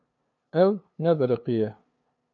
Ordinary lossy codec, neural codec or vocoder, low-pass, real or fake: AAC, 48 kbps; codec, 16 kHz, 2 kbps, FunCodec, trained on LibriTTS, 25 frames a second; 7.2 kHz; fake